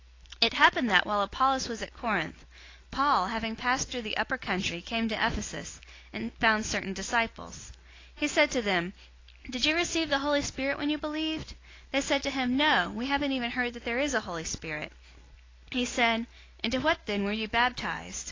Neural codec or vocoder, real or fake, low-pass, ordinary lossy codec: none; real; 7.2 kHz; AAC, 32 kbps